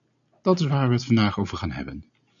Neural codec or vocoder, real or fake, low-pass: none; real; 7.2 kHz